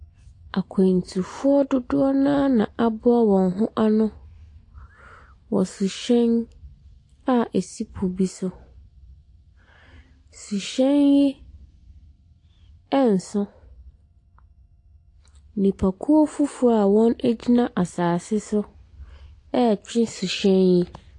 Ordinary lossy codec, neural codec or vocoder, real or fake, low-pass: AAC, 48 kbps; none; real; 10.8 kHz